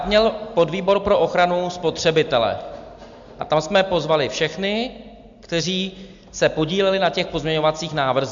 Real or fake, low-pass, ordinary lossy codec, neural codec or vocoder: real; 7.2 kHz; MP3, 64 kbps; none